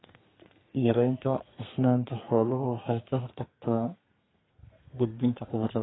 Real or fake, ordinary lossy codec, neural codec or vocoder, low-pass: fake; AAC, 16 kbps; codec, 32 kHz, 1.9 kbps, SNAC; 7.2 kHz